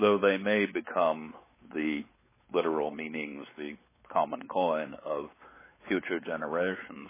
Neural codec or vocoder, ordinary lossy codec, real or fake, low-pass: codec, 16 kHz, 4 kbps, X-Codec, WavLM features, trained on Multilingual LibriSpeech; MP3, 16 kbps; fake; 3.6 kHz